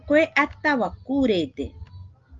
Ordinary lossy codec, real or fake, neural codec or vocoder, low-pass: Opus, 32 kbps; real; none; 7.2 kHz